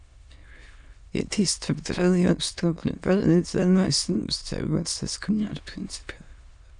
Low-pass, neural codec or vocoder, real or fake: 9.9 kHz; autoencoder, 22.05 kHz, a latent of 192 numbers a frame, VITS, trained on many speakers; fake